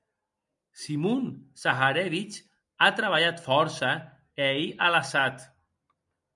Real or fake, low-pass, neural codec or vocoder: real; 10.8 kHz; none